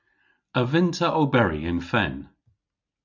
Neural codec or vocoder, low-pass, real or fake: none; 7.2 kHz; real